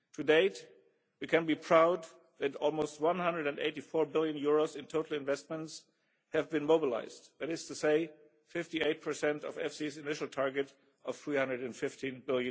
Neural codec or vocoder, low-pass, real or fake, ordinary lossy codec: none; none; real; none